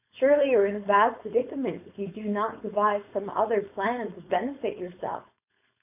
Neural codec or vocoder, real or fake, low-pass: codec, 16 kHz, 4.8 kbps, FACodec; fake; 3.6 kHz